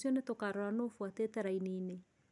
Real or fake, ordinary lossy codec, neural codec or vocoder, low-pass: real; none; none; 10.8 kHz